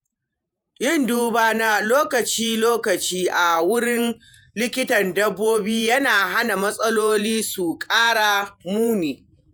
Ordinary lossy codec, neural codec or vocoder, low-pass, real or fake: none; vocoder, 48 kHz, 128 mel bands, Vocos; none; fake